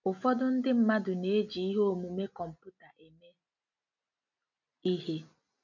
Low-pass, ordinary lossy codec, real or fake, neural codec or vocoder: 7.2 kHz; none; real; none